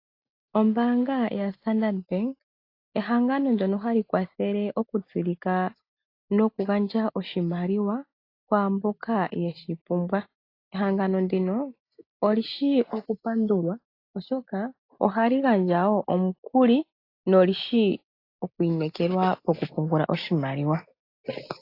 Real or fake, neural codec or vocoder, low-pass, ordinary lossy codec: real; none; 5.4 kHz; AAC, 32 kbps